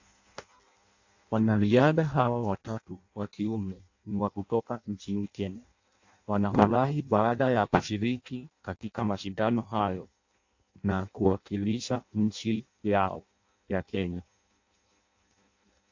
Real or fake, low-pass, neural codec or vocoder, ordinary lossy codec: fake; 7.2 kHz; codec, 16 kHz in and 24 kHz out, 0.6 kbps, FireRedTTS-2 codec; AAC, 48 kbps